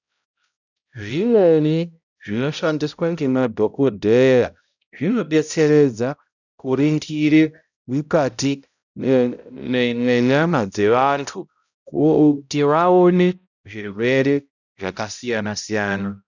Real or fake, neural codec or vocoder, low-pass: fake; codec, 16 kHz, 0.5 kbps, X-Codec, HuBERT features, trained on balanced general audio; 7.2 kHz